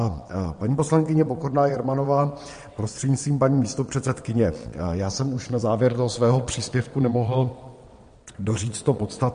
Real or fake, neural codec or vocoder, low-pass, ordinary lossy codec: fake; vocoder, 22.05 kHz, 80 mel bands, WaveNeXt; 9.9 kHz; MP3, 48 kbps